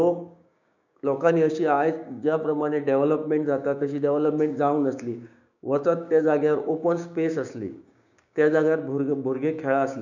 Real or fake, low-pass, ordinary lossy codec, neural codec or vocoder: fake; 7.2 kHz; none; codec, 16 kHz, 6 kbps, DAC